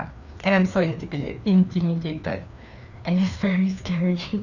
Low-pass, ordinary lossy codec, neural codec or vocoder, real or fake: 7.2 kHz; none; codec, 16 kHz, 2 kbps, FreqCodec, larger model; fake